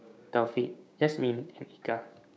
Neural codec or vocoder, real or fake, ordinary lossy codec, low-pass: codec, 16 kHz, 6 kbps, DAC; fake; none; none